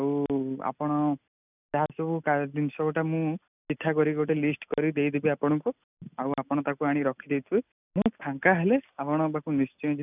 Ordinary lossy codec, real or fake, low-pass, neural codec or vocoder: none; real; 3.6 kHz; none